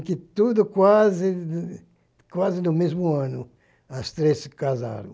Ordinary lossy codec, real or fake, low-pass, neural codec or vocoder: none; real; none; none